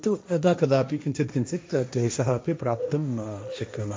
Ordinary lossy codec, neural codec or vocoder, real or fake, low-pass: none; codec, 16 kHz, 1.1 kbps, Voila-Tokenizer; fake; none